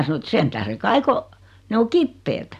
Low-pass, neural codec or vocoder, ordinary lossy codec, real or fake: 14.4 kHz; none; none; real